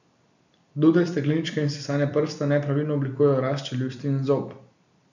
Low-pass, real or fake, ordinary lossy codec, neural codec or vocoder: 7.2 kHz; fake; none; vocoder, 44.1 kHz, 128 mel bands every 256 samples, BigVGAN v2